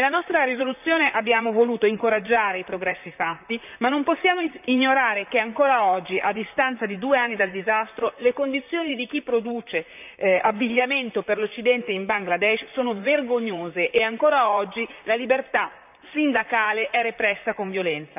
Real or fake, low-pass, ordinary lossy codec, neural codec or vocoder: fake; 3.6 kHz; none; vocoder, 44.1 kHz, 128 mel bands, Pupu-Vocoder